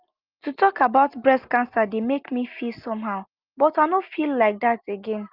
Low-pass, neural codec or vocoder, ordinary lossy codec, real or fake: 5.4 kHz; none; Opus, 32 kbps; real